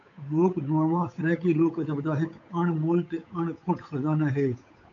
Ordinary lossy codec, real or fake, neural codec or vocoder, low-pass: AAC, 48 kbps; fake; codec, 16 kHz, 8 kbps, FunCodec, trained on Chinese and English, 25 frames a second; 7.2 kHz